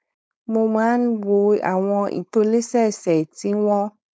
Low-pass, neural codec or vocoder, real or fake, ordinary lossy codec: none; codec, 16 kHz, 4.8 kbps, FACodec; fake; none